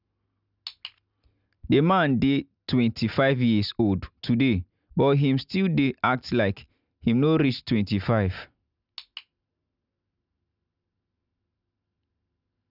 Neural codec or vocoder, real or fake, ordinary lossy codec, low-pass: none; real; none; 5.4 kHz